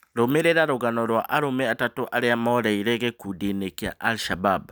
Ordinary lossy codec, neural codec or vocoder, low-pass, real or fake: none; none; none; real